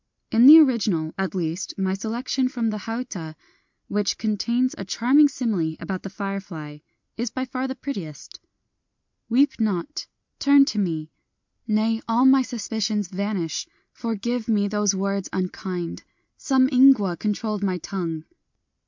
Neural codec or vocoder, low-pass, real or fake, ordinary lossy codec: none; 7.2 kHz; real; MP3, 64 kbps